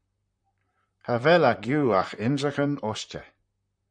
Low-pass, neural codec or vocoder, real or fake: 9.9 kHz; vocoder, 44.1 kHz, 128 mel bands, Pupu-Vocoder; fake